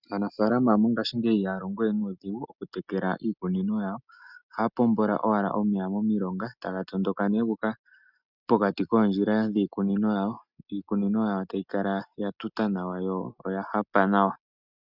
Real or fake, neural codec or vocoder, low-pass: real; none; 5.4 kHz